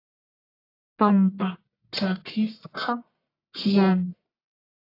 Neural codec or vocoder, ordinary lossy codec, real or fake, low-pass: codec, 44.1 kHz, 1.7 kbps, Pupu-Codec; AAC, 32 kbps; fake; 5.4 kHz